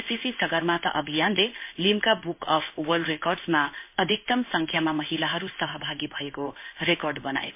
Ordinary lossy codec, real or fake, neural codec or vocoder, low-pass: MP3, 24 kbps; fake; codec, 16 kHz in and 24 kHz out, 1 kbps, XY-Tokenizer; 3.6 kHz